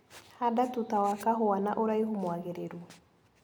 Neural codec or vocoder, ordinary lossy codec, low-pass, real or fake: none; none; none; real